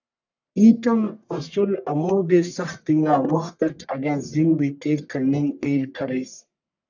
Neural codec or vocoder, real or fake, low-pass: codec, 44.1 kHz, 1.7 kbps, Pupu-Codec; fake; 7.2 kHz